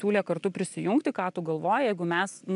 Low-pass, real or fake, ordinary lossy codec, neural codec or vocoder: 10.8 kHz; real; AAC, 96 kbps; none